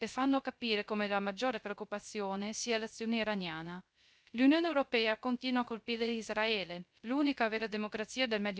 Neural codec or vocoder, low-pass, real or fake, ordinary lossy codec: codec, 16 kHz, 0.3 kbps, FocalCodec; none; fake; none